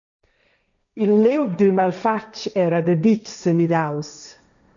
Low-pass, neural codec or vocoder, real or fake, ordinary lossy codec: 7.2 kHz; codec, 16 kHz, 1.1 kbps, Voila-Tokenizer; fake; MP3, 96 kbps